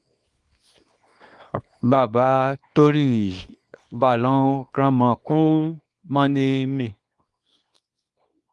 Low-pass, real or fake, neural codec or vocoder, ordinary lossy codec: 10.8 kHz; fake; codec, 24 kHz, 0.9 kbps, WavTokenizer, small release; Opus, 32 kbps